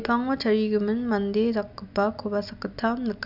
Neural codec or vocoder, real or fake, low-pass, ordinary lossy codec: none; real; 5.4 kHz; none